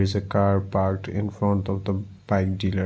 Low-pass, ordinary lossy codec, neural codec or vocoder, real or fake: none; none; none; real